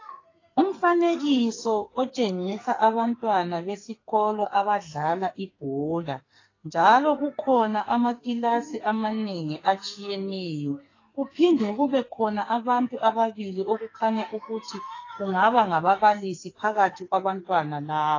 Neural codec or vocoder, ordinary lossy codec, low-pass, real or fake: codec, 44.1 kHz, 2.6 kbps, SNAC; AAC, 32 kbps; 7.2 kHz; fake